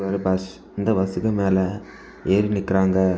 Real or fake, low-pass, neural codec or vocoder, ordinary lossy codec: real; none; none; none